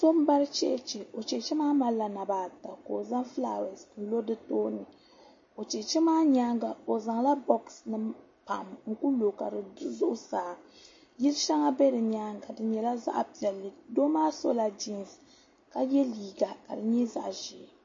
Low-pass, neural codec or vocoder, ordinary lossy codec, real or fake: 7.2 kHz; none; MP3, 32 kbps; real